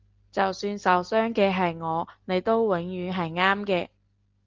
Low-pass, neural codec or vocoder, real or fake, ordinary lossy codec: 7.2 kHz; none; real; Opus, 16 kbps